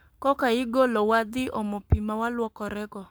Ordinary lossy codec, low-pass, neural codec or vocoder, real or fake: none; none; codec, 44.1 kHz, 7.8 kbps, Pupu-Codec; fake